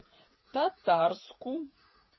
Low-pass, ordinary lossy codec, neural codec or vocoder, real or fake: 7.2 kHz; MP3, 24 kbps; codec, 16 kHz, 8 kbps, FreqCodec, smaller model; fake